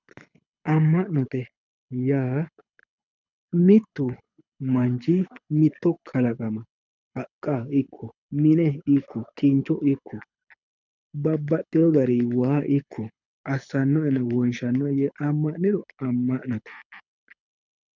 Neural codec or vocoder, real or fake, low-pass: codec, 24 kHz, 6 kbps, HILCodec; fake; 7.2 kHz